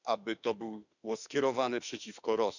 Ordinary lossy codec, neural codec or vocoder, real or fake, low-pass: none; autoencoder, 48 kHz, 32 numbers a frame, DAC-VAE, trained on Japanese speech; fake; 7.2 kHz